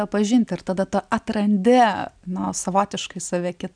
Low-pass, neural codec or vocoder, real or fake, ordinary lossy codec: 9.9 kHz; none; real; MP3, 96 kbps